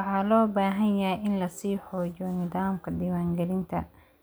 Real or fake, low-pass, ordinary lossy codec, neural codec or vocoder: real; none; none; none